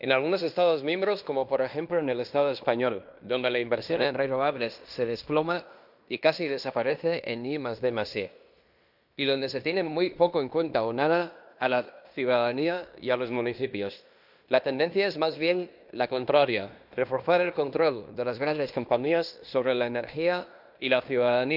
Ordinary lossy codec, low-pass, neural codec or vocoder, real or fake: none; 5.4 kHz; codec, 16 kHz in and 24 kHz out, 0.9 kbps, LongCat-Audio-Codec, fine tuned four codebook decoder; fake